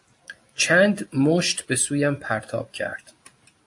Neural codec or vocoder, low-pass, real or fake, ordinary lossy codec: none; 10.8 kHz; real; AAC, 64 kbps